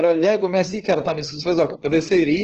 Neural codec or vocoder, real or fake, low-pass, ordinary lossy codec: codec, 16 kHz, 2 kbps, FunCodec, trained on LibriTTS, 25 frames a second; fake; 7.2 kHz; Opus, 16 kbps